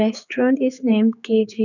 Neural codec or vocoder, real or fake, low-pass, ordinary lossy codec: codec, 16 kHz, 4 kbps, X-Codec, HuBERT features, trained on general audio; fake; 7.2 kHz; none